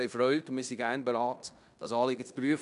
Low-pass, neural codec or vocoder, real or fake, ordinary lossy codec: 10.8 kHz; codec, 16 kHz in and 24 kHz out, 0.9 kbps, LongCat-Audio-Codec, fine tuned four codebook decoder; fake; none